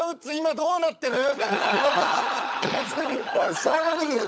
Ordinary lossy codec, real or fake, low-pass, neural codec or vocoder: none; fake; none; codec, 16 kHz, 16 kbps, FunCodec, trained on LibriTTS, 50 frames a second